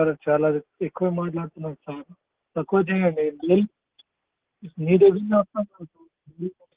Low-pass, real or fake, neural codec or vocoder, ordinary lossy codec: 3.6 kHz; real; none; Opus, 16 kbps